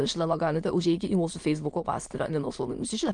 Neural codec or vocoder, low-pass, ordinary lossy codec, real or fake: autoencoder, 22.05 kHz, a latent of 192 numbers a frame, VITS, trained on many speakers; 9.9 kHz; Opus, 24 kbps; fake